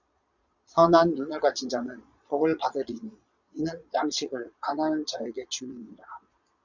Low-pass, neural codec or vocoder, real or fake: 7.2 kHz; vocoder, 22.05 kHz, 80 mel bands, Vocos; fake